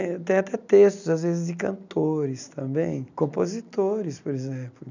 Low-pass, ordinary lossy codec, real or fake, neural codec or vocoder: 7.2 kHz; none; real; none